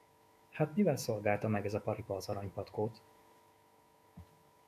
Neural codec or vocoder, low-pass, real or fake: autoencoder, 48 kHz, 128 numbers a frame, DAC-VAE, trained on Japanese speech; 14.4 kHz; fake